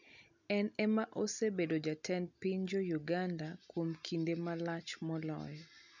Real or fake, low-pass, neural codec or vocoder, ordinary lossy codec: real; 7.2 kHz; none; none